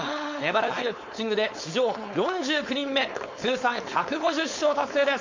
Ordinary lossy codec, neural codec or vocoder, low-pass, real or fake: MP3, 48 kbps; codec, 16 kHz, 4.8 kbps, FACodec; 7.2 kHz; fake